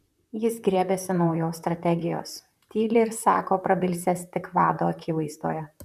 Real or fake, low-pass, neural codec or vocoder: fake; 14.4 kHz; vocoder, 44.1 kHz, 128 mel bands, Pupu-Vocoder